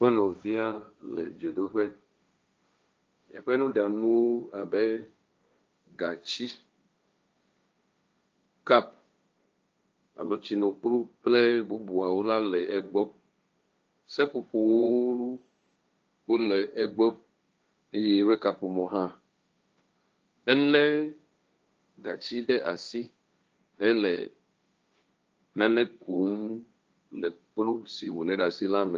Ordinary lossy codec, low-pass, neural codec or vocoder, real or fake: Opus, 24 kbps; 7.2 kHz; codec, 16 kHz, 1.1 kbps, Voila-Tokenizer; fake